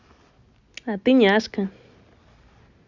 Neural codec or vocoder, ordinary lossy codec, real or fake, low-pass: none; Opus, 64 kbps; real; 7.2 kHz